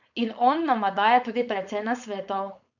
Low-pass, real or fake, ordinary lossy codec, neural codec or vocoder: 7.2 kHz; fake; none; codec, 16 kHz, 4.8 kbps, FACodec